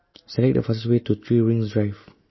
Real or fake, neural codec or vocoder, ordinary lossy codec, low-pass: real; none; MP3, 24 kbps; 7.2 kHz